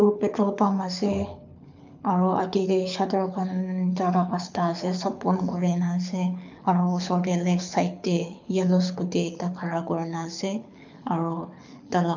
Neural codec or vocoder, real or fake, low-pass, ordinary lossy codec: codec, 24 kHz, 6 kbps, HILCodec; fake; 7.2 kHz; MP3, 64 kbps